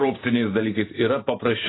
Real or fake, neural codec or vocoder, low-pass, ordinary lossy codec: fake; vocoder, 44.1 kHz, 128 mel bands every 512 samples, BigVGAN v2; 7.2 kHz; AAC, 16 kbps